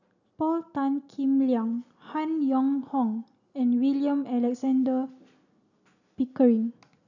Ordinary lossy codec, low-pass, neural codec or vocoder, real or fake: none; 7.2 kHz; none; real